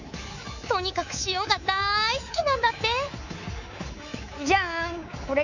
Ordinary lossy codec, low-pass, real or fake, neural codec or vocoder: none; 7.2 kHz; fake; codec, 24 kHz, 3.1 kbps, DualCodec